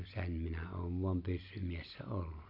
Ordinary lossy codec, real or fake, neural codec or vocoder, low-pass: AAC, 48 kbps; real; none; 5.4 kHz